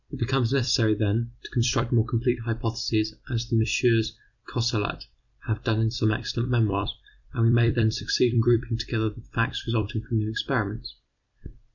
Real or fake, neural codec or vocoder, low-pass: fake; vocoder, 44.1 kHz, 128 mel bands every 256 samples, BigVGAN v2; 7.2 kHz